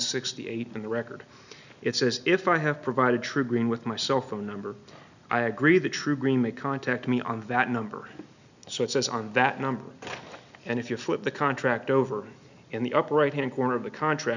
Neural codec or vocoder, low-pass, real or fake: none; 7.2 kHz; real